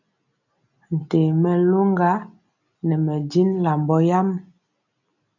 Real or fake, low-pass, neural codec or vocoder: real; 7.2 kHz; none